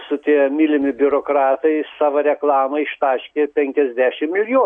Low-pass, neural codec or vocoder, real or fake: 9.9 kHz; none; real